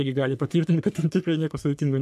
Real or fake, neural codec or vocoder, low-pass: fake; codec, 44.1 kHz, 3.4 kbps, Pupu-Codec; 14.4 kHz